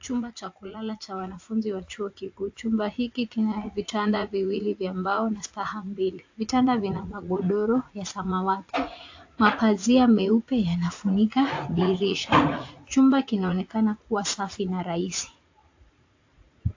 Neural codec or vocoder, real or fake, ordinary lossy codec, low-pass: vocoder, 44.1 kHz, 80 mel bands, Vocos; fake; AAC, 48 kbps; 7.2 kHz